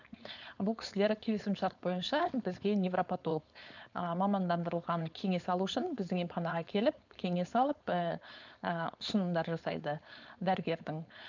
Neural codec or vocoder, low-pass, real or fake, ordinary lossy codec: codec, 16 kHz, 4.8 kbps, FACodec; 7.2 kHz; fake; none